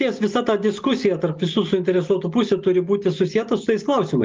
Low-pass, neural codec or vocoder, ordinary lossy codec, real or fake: 7.2 kHz; none; Opus, 24 kbps; real